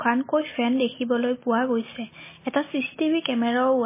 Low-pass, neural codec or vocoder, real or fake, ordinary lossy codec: 3.6 kHz; none; real; MP3, 16 kbps